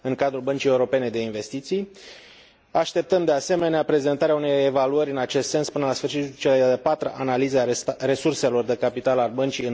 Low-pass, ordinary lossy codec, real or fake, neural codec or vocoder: none; none; real; none